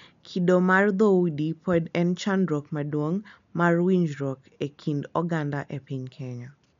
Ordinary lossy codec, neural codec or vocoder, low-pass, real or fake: MP3, 64 kbps; none; 7.2 kHz; real